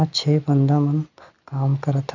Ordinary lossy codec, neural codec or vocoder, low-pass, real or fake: none; none; 7.2 kHz; real